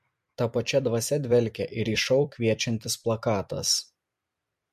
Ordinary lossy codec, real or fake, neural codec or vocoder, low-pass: MP3, 64 kbps; fake; vocoder, 44.1 kHz, 128 mel bands every 256 samples, BigVGAN v2; 14.4 kHz